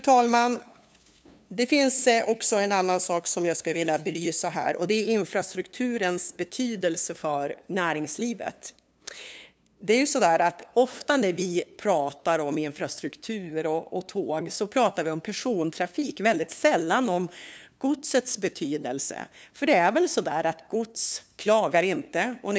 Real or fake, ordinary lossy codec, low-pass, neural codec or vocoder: fake; none; none; codec, 16 kHz, 2 kbps, FunCodec, trained on LibriTTS, 25 frames a second